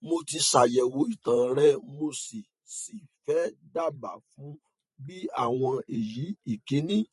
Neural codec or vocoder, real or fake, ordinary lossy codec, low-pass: vocoder, 44.1 kHz, 128 mel bands every 512 samples, BigVGAN v2; fake; MP3, 48 kbps; 14.4 kHz